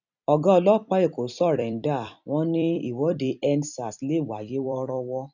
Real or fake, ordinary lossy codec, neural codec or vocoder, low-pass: fake; none; vocoder, 44.1 kHz, 128 mel bands every 256 samples, BigVGAN v2; 7.2 kHz